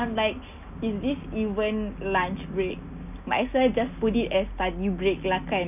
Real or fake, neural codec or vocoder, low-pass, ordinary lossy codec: real; none; 3.6 kHz; none